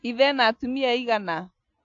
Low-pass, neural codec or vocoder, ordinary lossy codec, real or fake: 7.2 kHz; none; AAC, 48 kbps; real